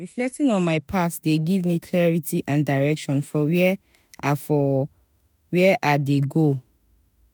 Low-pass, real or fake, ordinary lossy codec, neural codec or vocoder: none; fake; none; autoencoder, 48 kHz, 32 numbers a frame, DAC-VAE, trained on Japanese speech